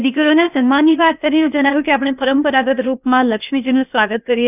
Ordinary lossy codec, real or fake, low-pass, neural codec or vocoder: none; fake; 3.6 kHz; codec, 16 kHz, 0.8 kbps, ZipCodec